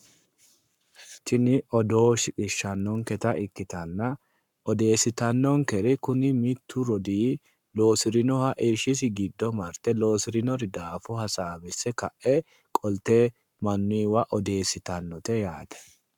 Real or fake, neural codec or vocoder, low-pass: fake; codec, 44.1 kHz, 7.8 kbps, Pupu-Codec; 19.8 kHz